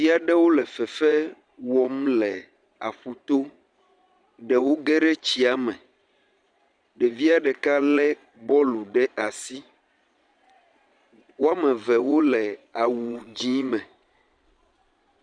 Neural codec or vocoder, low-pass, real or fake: vocoder, 22.05 kHz, 80 mel bands, Vocos; 9.9 kHz; fake